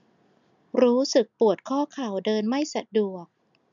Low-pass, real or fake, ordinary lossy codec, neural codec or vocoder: 7.2 kHz; real; none; none